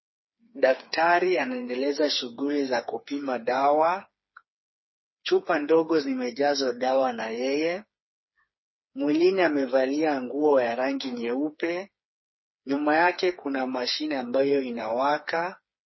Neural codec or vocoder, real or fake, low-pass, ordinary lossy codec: codec, 16 kHz, 4 kbps, FreqCodec, smaller model; fake; 7.2 kHz; MP3, 24 kbps